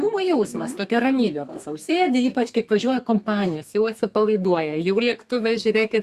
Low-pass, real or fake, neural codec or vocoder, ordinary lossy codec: 14.4 kHz; fake; codec, 32 kHz, 1.9 kbps, SNAC; Opus, 64 kbps